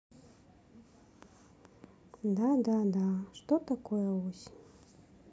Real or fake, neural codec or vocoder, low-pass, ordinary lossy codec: real; none; none; none